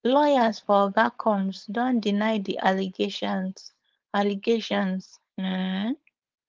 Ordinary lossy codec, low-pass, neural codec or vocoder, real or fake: Opus, 32 kbps; 7.2 kHz; codec, 16 kHz, 4.8 kbps, FACodec; fake